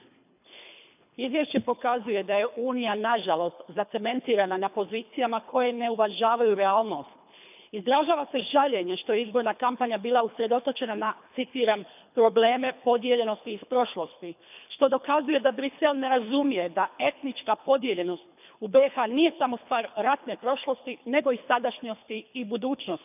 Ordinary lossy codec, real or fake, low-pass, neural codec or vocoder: none; fake; 3.6 kHz; codec, 24 kHz, 3 kbps, HILCodec